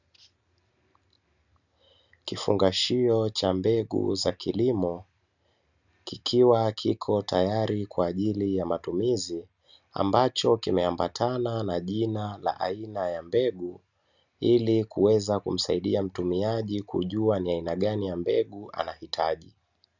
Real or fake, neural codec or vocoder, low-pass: real; none; 7.2 kHz